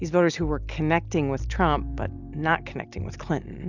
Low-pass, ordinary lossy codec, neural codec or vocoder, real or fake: 7.2 kHz; Opus, 64 kbps; none; real